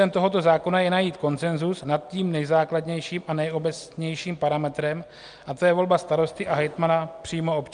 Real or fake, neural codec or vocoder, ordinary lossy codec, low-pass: real; none; Opus, 32 kbps; 9.9 kHz